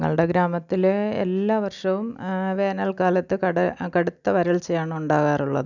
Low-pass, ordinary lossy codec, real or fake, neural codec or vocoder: 7.2 kHz; none; real; none